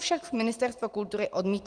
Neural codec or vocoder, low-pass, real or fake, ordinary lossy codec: none; 9.9 kHz; real; Opus, 24 kbps